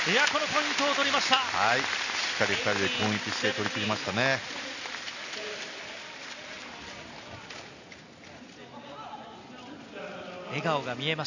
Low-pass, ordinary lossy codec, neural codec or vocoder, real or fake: 7.2 kHz; none; none; real